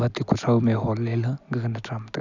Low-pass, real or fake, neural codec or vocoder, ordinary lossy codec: 7.2 kHz; real; none; none